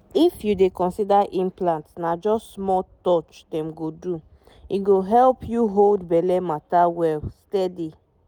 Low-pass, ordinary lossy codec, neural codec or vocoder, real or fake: 19.8 kHz; none; none; real